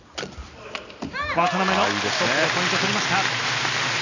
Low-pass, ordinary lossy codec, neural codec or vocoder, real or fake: 7.2 kHz; none; none; real